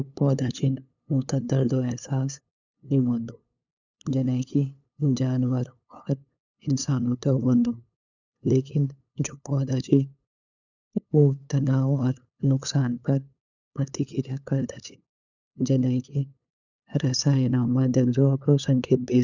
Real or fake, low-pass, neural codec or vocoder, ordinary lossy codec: fake; 7.2 kHz; codec, 16 kHz, 8 kbps, FunCodec, trained on LibriTTS, 25 frames a second; none